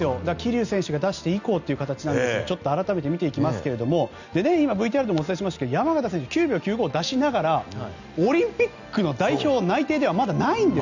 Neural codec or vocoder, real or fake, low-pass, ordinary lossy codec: none; real; 7.2 kHz; none